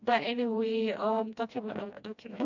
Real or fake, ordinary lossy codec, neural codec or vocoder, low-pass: fake; none; codec, 16 kHz, 1 kbps, FreqCodec, smaller model; 7.2 kHz